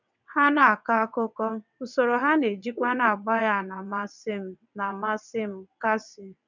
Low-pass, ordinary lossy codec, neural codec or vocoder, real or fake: 7.2 kHz; none; vocoder, 22.05 kHz, 80 mel bands, WaveNeXt; fake